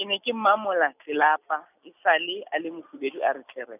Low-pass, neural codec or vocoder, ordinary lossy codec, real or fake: 3.6 kHz; none; none; real